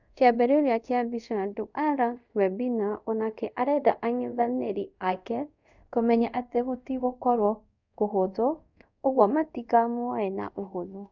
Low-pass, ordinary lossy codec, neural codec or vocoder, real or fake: 7.2 kHz; none; codec, 24 kHz, 0.5 kbps, DualCodec; fake